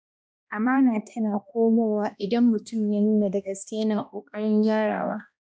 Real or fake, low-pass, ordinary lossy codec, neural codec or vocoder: fake; none; none; codec, 16 kHz, 1 kbps, X-Codec, HuBERT features, trained on balanced general audio